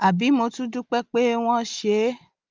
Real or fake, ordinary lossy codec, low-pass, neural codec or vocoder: real; Opus, 24 kbps; 7.2 kHz; none